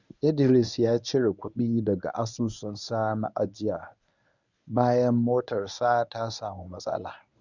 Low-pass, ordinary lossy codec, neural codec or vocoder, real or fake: 7.2 kHz; none; codec, 24 kHz, 0.9 kbps, WavTokenizer, medium speech release version 1; fake